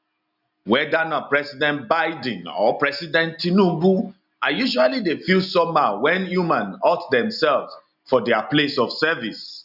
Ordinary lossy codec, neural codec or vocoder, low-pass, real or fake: none; none; 5.4 kHz; real